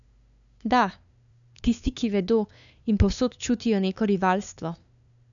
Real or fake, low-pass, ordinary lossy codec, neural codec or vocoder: fake; 7.2 kHz; none; codec, 16 kHz, 2 kbps, FunCodec, trained on LibriTTS, 25 frames a second